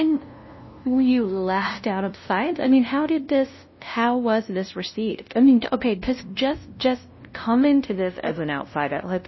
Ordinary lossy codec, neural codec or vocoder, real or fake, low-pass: MP3, 24 kbps; codec, 16 kHz, 0.5 kbps, FunCodec, trained on LibriTTS, 25 frames a second; fake; 7.2 kHz